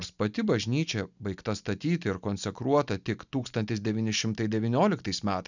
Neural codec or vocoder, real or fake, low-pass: none; real; 7.2 kHz